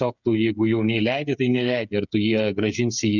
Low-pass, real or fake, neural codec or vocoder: 7.2 kHz; fake; codec, 16 kHz, 8 kbps, FreqCodec, smaller model